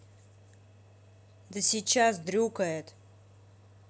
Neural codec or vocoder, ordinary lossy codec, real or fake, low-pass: none; none; real; none